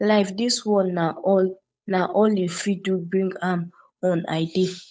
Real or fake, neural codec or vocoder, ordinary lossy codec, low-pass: fake; codec, 16 kHz, 8 kbps, FunCodec, trained on Chinese and English, 25 frames a second; none; none